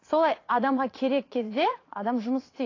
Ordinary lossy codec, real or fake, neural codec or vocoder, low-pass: AAC, 32 kbps; fake; vocoder, 44.1 kHz, 80 mel bands, Vocos; 7.2 kHz